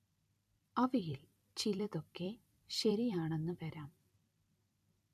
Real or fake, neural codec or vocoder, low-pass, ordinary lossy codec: fake; vocoder, 44.1 kHz, 128 mel bands every 256 samples, BigVGAN v2; 14.4 kHz; none